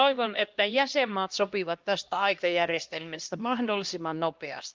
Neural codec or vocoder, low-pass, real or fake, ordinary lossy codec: codec, 16 kHz, 1 kbps, X-Codec, HuBERT features, trained on LibriSpeech; 7.2 kHz; fake; Opus, 32 kbps